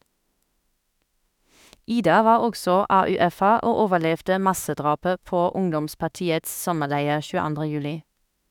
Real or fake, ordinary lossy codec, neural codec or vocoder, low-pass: fake; none; autoencoder, 48 kHz, 32 numbers a frame, DAC-VAE, trained on Japanese speech; 19.8 kHz